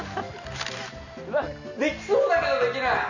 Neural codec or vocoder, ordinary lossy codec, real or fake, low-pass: none; none; real; 7.2 kHz